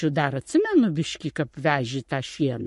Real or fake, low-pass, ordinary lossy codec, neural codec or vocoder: fake; 14.4 kHz; MP3, 48 kbps; codec, 44.1 kHz, 7.8 kbps, Pupu-Codec